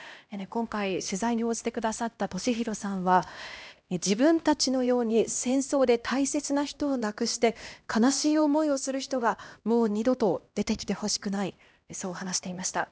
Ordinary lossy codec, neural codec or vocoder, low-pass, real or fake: none; codec, 16 kHz, 1 kbps, X-Codec, HuBERT features, trained on LibriSpeech; none; fake